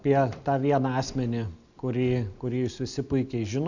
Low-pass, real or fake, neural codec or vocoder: 7.2 kHz; real; none